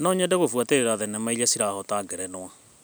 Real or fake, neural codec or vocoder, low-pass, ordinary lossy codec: real; none; none; none